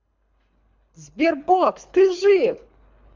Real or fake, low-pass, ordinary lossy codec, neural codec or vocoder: fake; 7.2 kHz; MP3, 64 kbps; codec, 24 kHz, 3 kbps, HILCodec